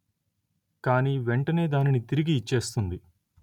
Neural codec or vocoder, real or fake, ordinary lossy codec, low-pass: none; real; none; 19.8 kHz